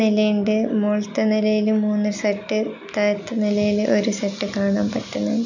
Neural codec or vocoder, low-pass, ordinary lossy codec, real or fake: none; 7.2 kHz; none; real